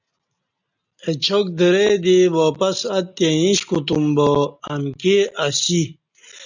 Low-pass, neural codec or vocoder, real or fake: 7.2 kHz; none; real